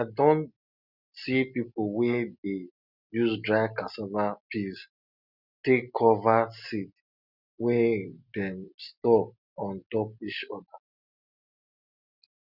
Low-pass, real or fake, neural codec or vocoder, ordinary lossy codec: 5.4 kHz; real; none; none